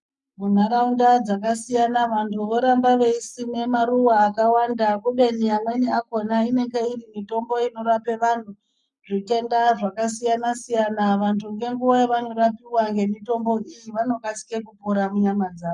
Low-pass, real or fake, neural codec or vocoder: 10.8 kHz; fake; codec, 44.1 kHz, 7.8 kbps, Pupu-Codec